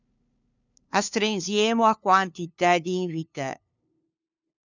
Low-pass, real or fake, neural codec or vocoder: 7.2 kHz; fake; codec, 16 kHz, 2 kbps, FunCodec, trained on LibriTTS, 25 frames a second